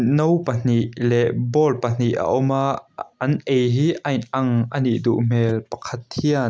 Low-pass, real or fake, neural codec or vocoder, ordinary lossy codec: none; real; none; none